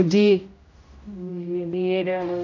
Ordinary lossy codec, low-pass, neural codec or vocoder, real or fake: none; 7.2 kHz; codec, 16 kHz, 0.5 kbps, X-Codec, HuBERT features, trained on general audio; fake